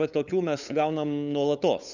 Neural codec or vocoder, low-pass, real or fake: codec, 16 kHz, 8 kbps, FunCodec, trained on LibriTTS, 25 frames a second; 7.2 kHz; fake